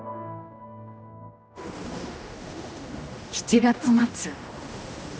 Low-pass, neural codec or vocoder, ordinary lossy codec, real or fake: none; codec, 16 kHz, 1 kbps, X-Codec, HuBERT features, trained on general audio; none; fake